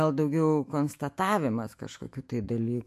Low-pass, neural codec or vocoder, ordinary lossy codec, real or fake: 14.4 kHz; autoencoder, 48 kHz, 128 numbers a frame, DAC-VAE, trained on Japanese speech; MP3, 64 kbps; fake